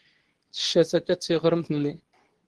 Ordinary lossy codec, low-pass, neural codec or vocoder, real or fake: Opus, 16 kbps; 10.8 kHz; codec, 24 kHz, 0.9 kbps, WavTokenizer, medium speech release version 2; fake